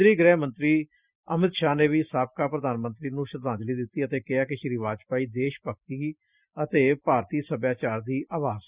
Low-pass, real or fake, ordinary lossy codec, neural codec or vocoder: 3.6 kHz; real; Opus, 64 kbps; none